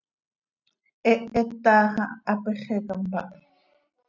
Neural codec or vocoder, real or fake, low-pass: none; real; 7.2 kHz